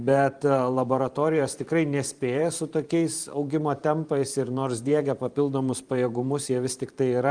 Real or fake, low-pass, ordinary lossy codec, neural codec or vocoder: fake; 9.9 kHz; Opus, 32 kbps; vocoder, 44.1 kHz, 128 mel bands every 512 samples, BigVGAN v2